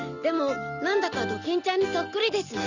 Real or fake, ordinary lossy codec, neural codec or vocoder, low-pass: real; none; none; 7.2 kHz